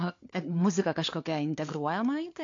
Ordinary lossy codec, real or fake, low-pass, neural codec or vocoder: AAC, 48 kbps; fake; 7.2 kHz; codec, 16 kHz, 4 kbps, FunCodec, trained on LibriTTS, 50 frames a second